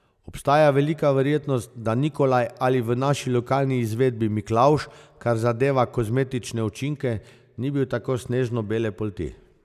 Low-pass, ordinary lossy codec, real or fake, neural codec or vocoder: 14.4 kHz; none; real; none